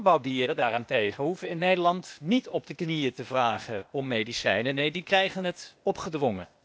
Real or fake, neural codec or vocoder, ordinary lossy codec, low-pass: fake; codec, 16 kHz, 0.8 kbps, ZipCodec; none; none